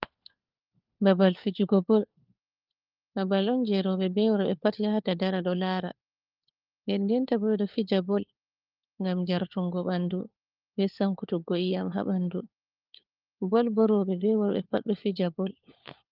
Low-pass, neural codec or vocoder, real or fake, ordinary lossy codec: 5.4 kHz; codec, 16 kHz, 4 kbps, FunCodec, trained on LibriTTS, 50 frames a second; fake; Opus, 32 kbps